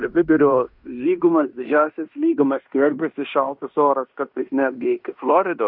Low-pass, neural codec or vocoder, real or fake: 5.4 kHz; codec, 16 kHz in and 24 kHz out, 0.9 kbps, LongCat-Audio-Codec, fine tuned four codebook decoder; fake